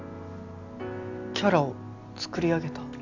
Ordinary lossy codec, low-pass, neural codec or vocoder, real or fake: AAC, 48 kbps; 7.2 kHz; none; real